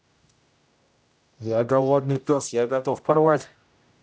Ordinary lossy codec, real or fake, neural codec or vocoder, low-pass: none; fake; codec, 16 kHz, 0.5 kbps, X-Codec, HuBERT features, trained on general audio; none